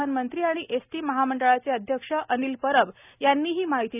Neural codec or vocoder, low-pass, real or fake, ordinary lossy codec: none; 3.6 kHz; real; none